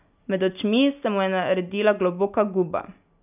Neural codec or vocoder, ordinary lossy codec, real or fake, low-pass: none; none; real; 3.6 kHz